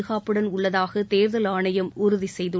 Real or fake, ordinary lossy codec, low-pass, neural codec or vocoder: real; none; none; none